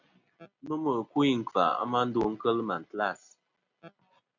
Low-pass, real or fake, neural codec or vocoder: 7.2 kHz; real; none